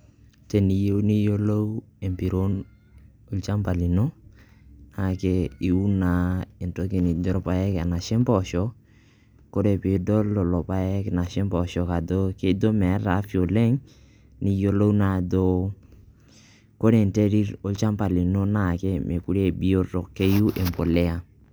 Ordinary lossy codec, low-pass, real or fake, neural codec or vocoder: none; none; real; none